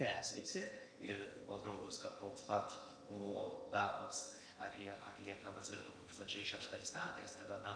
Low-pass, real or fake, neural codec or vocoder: 9.9 kHz; fake; codec, 16 kHz in and 24 kHz out, 0.8 kbps, FocalCodec, streaming, 65536 codes